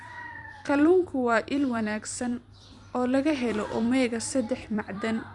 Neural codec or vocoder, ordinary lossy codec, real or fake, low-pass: vocoder, 44.1 kHz, 128 mel bands every 256 samples, BigVGAN v2; none; fake; 10.8 kHz